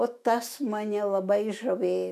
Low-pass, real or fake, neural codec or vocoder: 14.4 kHz; fake; autoencoder, 48 kHz, 128 numbers a frame, DAC-VAE, trained on Japanese speech